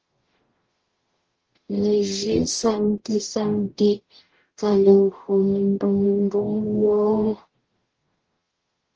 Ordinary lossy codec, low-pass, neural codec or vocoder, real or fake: Opus, 16 kbps; 7.2 kHz; codec, 44.1 kHz, 0.9 kbps, DAC; fake